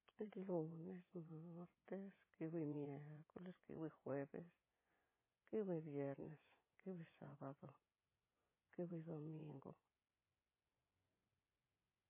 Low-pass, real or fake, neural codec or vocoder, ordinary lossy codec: 3.6 kHz; fake; vocoder, 24 kHz, 100 mel bands, Vocos; MP3, 16 kbps